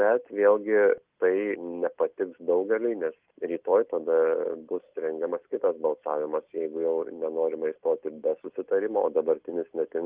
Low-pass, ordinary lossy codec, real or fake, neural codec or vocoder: 3.6 kHz; Opus, 32 kbps; real; none